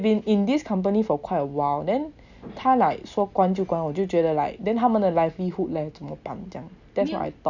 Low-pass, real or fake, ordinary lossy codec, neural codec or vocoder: 7.2 kHz; real; none; none